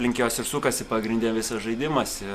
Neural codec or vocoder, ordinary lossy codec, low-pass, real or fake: none; Opus, 64 kbps; 14.4 kHz; real